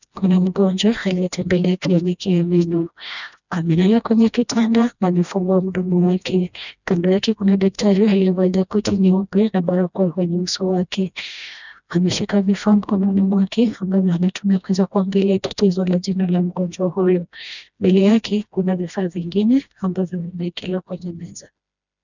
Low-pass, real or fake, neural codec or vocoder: 7.2 kHz; fake; codec, 16 kHz, 1 kbps, FreqCodec, smaller model